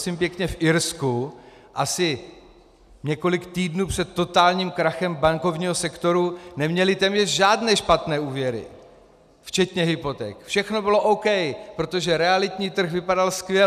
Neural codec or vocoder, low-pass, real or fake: none; 14.4 kHz; real